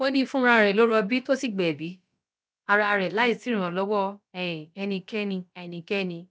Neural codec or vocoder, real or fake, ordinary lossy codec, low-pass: codec, 16 kHz, about 1 kbps, DyCAST, with the encoder's durations; fake; none; none